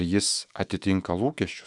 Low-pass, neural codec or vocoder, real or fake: 10.8 kHz; autoencoder, 48 kHz, 128 numbers a frame, DAC-VAE, trained on Japanese speech; fake